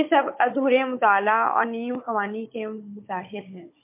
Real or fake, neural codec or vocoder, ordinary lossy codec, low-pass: fake; codec, 16 kHz, 16 kbps, FunCodec, trained on Chinese and English, 50 frames a second; MP3, 24 kbps; 3.6 kHz